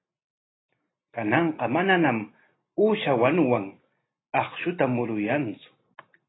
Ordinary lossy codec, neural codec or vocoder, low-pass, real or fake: AAC, 16 kbps; none; 7.2 kHz; real